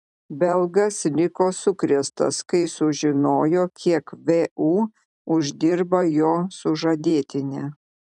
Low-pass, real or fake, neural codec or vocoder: 10.8 kHz; fake; vocoder, 44.1 kHz, 128 mel bands every 512 samples, BigVGAN v2